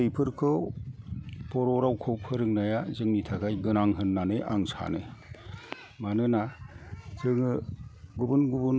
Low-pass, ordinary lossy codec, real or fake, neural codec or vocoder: none; none; real; none